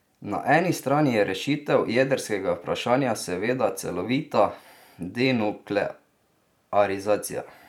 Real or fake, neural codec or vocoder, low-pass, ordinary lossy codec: fake; vocoder, 44.1 kHz, 128 mel bands every 512 samples, BigVGAN v2; 19.8 kHz; none